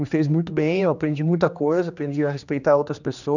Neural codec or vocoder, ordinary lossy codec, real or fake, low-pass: codec, 16 kHz, 2 kbps, X-Codec, HuBERT features, trained on general audio; none; fake; 7.2 kHz